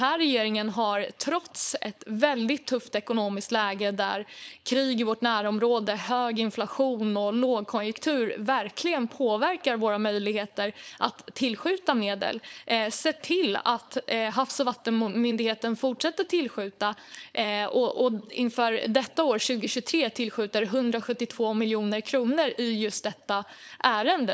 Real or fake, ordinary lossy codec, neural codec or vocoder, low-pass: fake; none; codec, 16 kHz, 4.8 kbps, FACodec; none